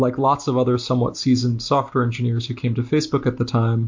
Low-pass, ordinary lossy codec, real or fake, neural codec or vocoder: 7.2 kHz; MP3, 48 kbps; real; none